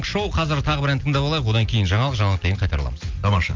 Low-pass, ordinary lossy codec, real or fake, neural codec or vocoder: 7.2 kHz; Opus, 24 kbps; real; none